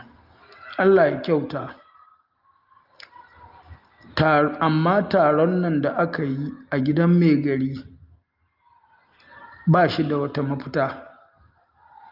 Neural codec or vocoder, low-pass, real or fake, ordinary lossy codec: none; 5.4 kHz; real; Opus, 32 kbps